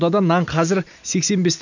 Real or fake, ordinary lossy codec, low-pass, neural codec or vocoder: real; none; 7.2 kHz; none